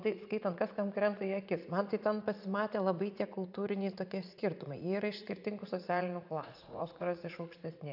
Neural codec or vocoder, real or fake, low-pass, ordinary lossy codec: vocoder, 44.1 kHz, 80 mel bands, Vocos; fake; 5.4 kHz; AAC, 48 kbps